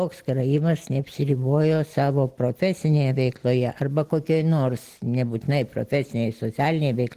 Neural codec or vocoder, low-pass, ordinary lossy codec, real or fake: none; 14.4 kHz; Opus, 16 kbps; real